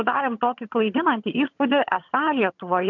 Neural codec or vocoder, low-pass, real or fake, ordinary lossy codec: vocoder, 22.05 kHz, 80 mel bands, HiFi-GAN; 7.2 kHz; fake; MP3, 64 kbps